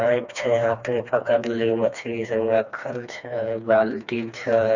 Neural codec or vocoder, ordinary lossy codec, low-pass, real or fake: codec, 16 kHz, 2 kbps, FreqCodec, smaller model; Opus, 64 kbps; 7.2 kHz; fake